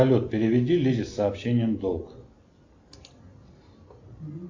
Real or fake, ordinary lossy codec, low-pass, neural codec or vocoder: real; AAC, 48 kbps; 7.2 kHz; none